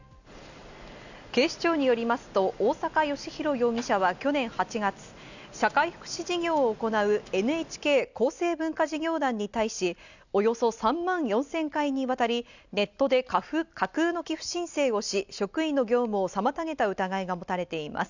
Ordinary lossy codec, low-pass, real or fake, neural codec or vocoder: none; 7.2 kHz; real; none